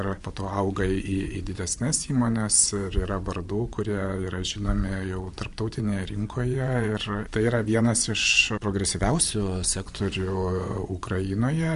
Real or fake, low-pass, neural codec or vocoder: fake; 10.8 kHz; vocoder, 24 kHz, 100 mel bands, Vocos